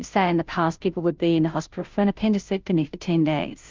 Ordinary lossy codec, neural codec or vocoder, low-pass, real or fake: Opus, 24 kbps; codec, 16 kHz, 0.5 kbps, FunCodec, trained on Chinese and English, 25 frames a second; 7.2 kHz; fake